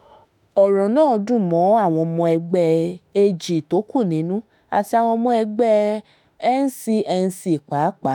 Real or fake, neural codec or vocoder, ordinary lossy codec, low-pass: fake; autoencoder, 48 kHz, 32 numbers a frame, DAC-VAE, trained on Japanese speech; none; 19.8 kHz